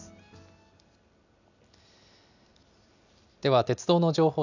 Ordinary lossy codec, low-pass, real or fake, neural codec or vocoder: none; 7.2 kHz; real; none